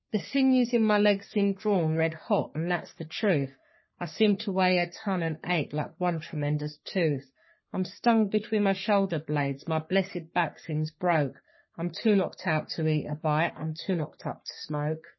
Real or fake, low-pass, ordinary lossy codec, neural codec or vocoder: fake; 7.2 kHz; MP3, 24 kbps; codec, 44.1 kHz, 3.4 kbps, Pupu-Codec